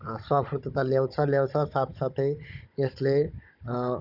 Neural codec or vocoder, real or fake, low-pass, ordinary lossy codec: codec, 16 kHz, 16 kbps, FunCodec, trained on Chinese and English, 50 frames a second; fake; 5.4 kHz; none